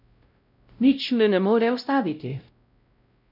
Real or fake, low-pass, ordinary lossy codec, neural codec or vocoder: fake; 5.4 kHz; none; codec, 16 kHz, 0.5 kbps, X-Codec, WavLM features, trained on Multilingual LibriSpeech